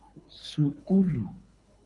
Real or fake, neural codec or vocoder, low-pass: fake; codec, 24 kHz, 3 kbps, HILCodec; 10.8 kHz